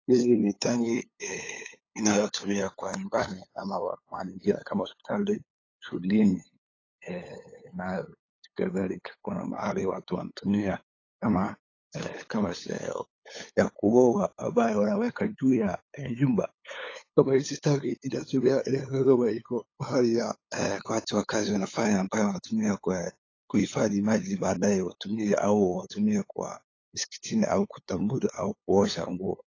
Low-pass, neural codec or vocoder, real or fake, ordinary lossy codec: 7.2 kHz; codec, 16 kHz, 8 kbps, FunCodec, trained on LibriTTS, 25 frames a second; fake; AAC, 32 kbps